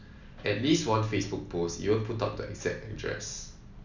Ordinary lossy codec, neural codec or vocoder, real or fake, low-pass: none; none; real; 7.2 kHz